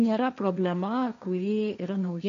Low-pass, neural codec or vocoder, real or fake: 7.2 kHz; codec, 16 kHz, 1.1 kbps, Voila-Tokenizer; fake